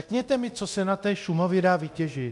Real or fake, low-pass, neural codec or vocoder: fake; 10.8 kHz; codec, 24 kHz, 0.9 kbps, DualCodec